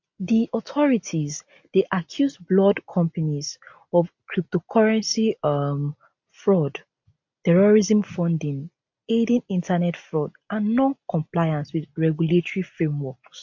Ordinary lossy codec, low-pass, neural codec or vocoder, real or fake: MP3, 48 kbps; 7.2 kHz; none; real